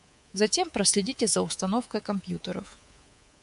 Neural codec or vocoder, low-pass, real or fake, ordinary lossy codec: codec, 24 kHz, 3.1 kbps, DualCodec; 10.8 kHz; fake; MP3, 64 kbps